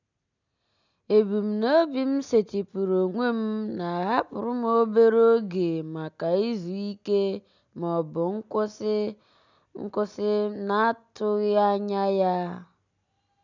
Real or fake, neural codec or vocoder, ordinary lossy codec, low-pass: real; none; none; 7.2 kHz